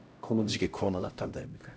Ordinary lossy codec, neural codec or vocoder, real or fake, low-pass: none; codec, 16 kHz, 0.5 kbps, X-Codec, HuBERT features, trained on LibriSpeech; fake; none